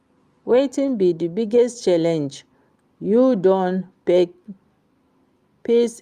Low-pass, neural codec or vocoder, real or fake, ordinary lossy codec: 14.4 kHz; none; real; Opus, 32 kbps